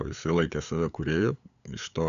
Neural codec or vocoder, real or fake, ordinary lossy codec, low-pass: codec, 16 kHz, 8 kbps, FunCodec, trained on LibriTTS, 25 frames a second; fake; MP3, 96 kbps; 7.2 kHz